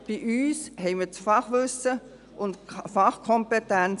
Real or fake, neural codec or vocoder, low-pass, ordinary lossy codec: real; none; 10.8 kHz; none